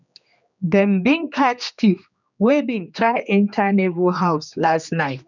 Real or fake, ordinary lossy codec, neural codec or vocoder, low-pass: fake; none; codec, 16 kHz, 2 kbps, X-Codec, HuBERT features, trained on general audio; 7.2 kHz